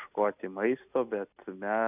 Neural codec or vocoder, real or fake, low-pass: none; real; 3.6 kHz